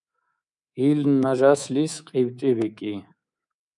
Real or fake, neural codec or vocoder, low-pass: fake; codec, 24 kHz, 3.1 kbps, DualCodec; 10.8 kHz